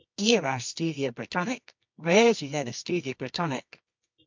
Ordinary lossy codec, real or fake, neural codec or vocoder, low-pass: MP3, 64 kbps; fake; codec, 24 kHz, 0.9 kbps, WavTokenizer, medium music audio release; 7.2 kHz